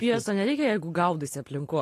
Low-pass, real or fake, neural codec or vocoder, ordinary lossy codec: 14.4 kHz; real; none; AAC, 48 kbps